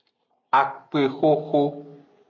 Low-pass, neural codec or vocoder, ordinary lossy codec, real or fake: 7.2 kHz; none; MP3, 64 kbps; real